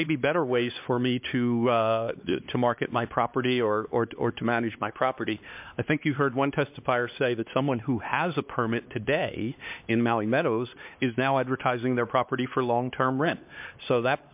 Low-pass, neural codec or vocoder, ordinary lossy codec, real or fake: 3.6 kHz; codec, 16 kHz, 2 kbps, X-Codec, HuBERT features, trained on LibriSpeech; MP3, 32 kbps; fake